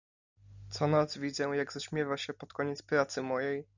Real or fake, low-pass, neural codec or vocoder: real; 7.2 kHz; none